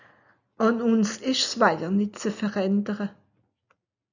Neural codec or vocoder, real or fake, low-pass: none; real; 7.2 kHz